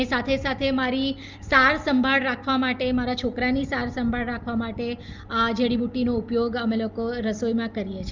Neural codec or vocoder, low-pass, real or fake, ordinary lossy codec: none; 7.2 kHz; real; Opus, 24 kbps